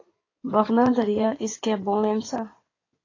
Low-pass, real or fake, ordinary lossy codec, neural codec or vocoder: 7.2 kHz; fake; AAC, 32 kbps; codec, 16 kHz in and 24 kHz out, 2.2 kbps, FireRedTTS-2 codec